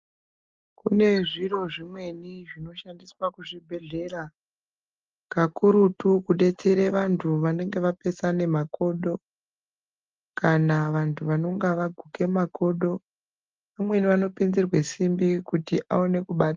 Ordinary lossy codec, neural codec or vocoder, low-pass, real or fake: Opus, 32 kbps; none; 7.2 kHz; real